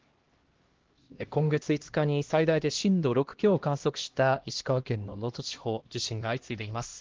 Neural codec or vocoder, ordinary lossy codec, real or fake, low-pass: codec, 16 kHz, 1 kbps, X-Codec, HuBERT features, trained on LibriSpeech; Opus, 16 kbps; fake; 7.2 kHz